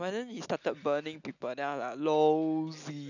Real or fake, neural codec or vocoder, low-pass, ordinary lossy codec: real; none; 7.2 kHz; none